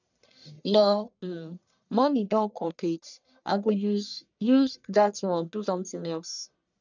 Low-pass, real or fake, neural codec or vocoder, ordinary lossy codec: 7.2 kHz; fake; codec, 44.1 kHz, 1.7 kbps, Pupu-Codec; none